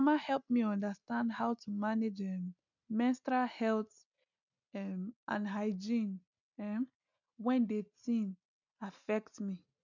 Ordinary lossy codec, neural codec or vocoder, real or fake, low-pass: none; none; real; 7.2 kHz